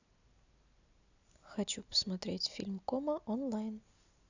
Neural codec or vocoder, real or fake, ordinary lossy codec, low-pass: none; real; none; 7.2 kHz